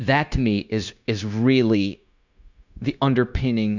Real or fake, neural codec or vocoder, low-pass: fake; codec, 16 kHz, 0.9 kbps, LongCat-Audio-Codec; 7.2 kHz